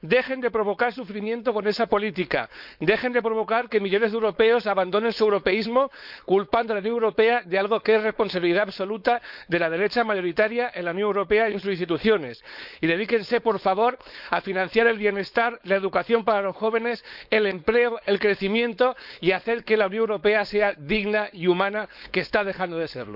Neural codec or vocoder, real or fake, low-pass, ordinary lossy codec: codec, 16 kHz, 4.8 kbps, FACodec; fake; 5.4 kHz; none